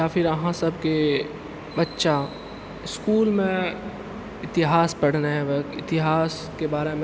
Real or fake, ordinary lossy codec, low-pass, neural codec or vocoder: real; none; none; none